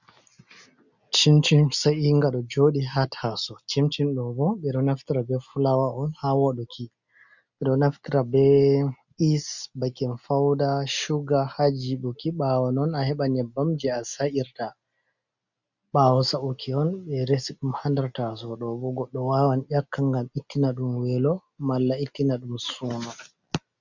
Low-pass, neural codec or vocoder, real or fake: 7.2 kHz; none; real